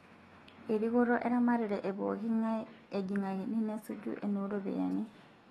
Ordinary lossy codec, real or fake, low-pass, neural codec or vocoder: AAC, 32 kbps; fake; 19.8 kHz; autoencoder, 48 kHz, 128 numbers a frame, DAC-VAE, trained on Japanese speech